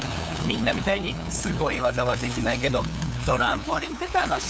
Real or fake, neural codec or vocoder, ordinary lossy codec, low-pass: fake; codec, 16 kHz, 2 kbps, FunCodec, trained on LibriTTS, 25 frames a second; none; none